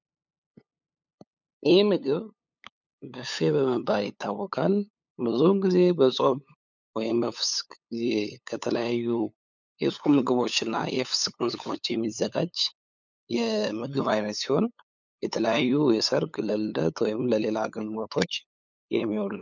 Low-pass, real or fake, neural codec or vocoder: 7.2 kHz; fake; codec, 16 kHz, 8 kbps, FunCodec, trained on LibriTTS, 25 frames a second